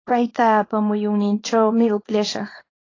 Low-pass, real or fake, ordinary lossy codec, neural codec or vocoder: 7.2 kHz; fake; AAC, 32 kbps; codec, 24 kHz, 0.9 kbps, WavTokenizer, small release